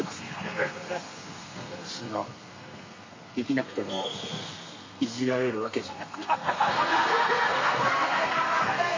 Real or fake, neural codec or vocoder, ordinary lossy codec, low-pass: fake; codec, 32 kHz, 1.9 kbps, SNAC; MP3, 32 kbps; 7.2 kHz